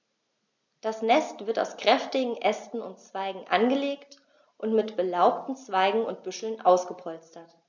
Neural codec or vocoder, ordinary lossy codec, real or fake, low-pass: none; none; real; 7.2 kHz